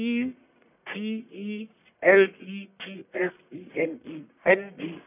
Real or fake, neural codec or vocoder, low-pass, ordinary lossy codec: fake; codec, 44.1 kHz, 1.7 kbps, Pupu-Codec; 3.6 kHz; none